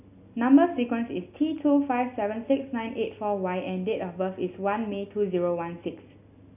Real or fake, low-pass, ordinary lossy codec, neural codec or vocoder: real; 3.6 kHz; none; none